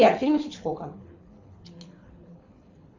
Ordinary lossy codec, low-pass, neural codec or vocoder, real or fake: Opus, 64 kbps; 7.2 kHz; codec, 24 kHz, 6 kbps, HILCodec; fake